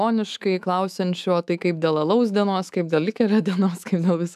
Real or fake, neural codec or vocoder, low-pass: fake; autoencoder, 48 kHz, 128 numbers a frame, DAC-VAE, trained on Japanese speech; 14.4 kHz